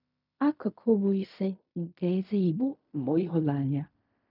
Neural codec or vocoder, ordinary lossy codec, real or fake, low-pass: codec, 16 kHz in and 24 kHz out, 0.4 kbps, LongCat-Audio-Codec, fine tuned four codebook decoder; none; fake; 5.4 kHz